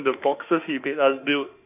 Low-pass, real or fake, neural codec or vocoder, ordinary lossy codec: 3.6 kHz; fake; autoencoder, 48 kHz, 32 numbers a frame, DAC-VAE, trained on Japanese speech; none